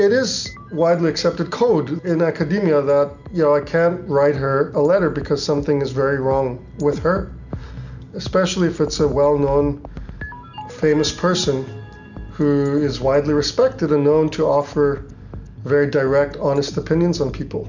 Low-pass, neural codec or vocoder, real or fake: 7.2 kHz; none; real